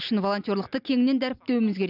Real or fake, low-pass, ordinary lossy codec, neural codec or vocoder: real; 5.4 kHz; none; none